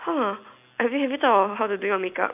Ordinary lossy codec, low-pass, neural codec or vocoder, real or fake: none; 3.6 kHz; none; real